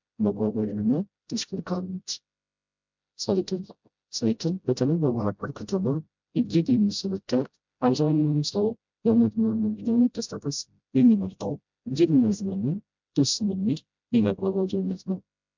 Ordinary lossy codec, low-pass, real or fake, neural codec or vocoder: MP3, 64 kbps; 7.2 kHz; fake; codec, 16 kHz, 0.5 kbps, FreqCodec, smaller model